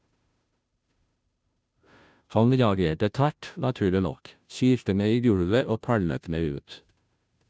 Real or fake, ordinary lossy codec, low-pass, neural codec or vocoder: fake; none; none; codec, 16 kHz, 0.5 kbps, FunCodec, trained on Chinese and English, 25 frames a second